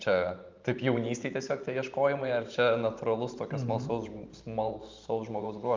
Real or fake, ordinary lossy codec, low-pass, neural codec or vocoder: fake; Opus, 24 kbps; 7.2 kHz; vocoder, 44.1 kHz, 128 mel bands every 512 samples, BigVGAN v2